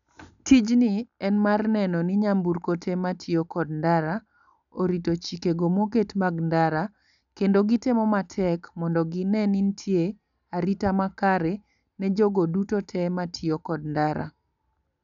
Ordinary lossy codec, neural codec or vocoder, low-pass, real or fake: none; none; 7.2 kHz; real